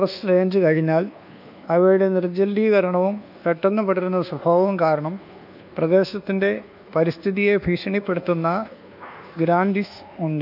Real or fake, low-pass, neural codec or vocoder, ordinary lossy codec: fake; 5.4 kHz; codec, 24 kHz, 1.2 kbps, DualCodec; none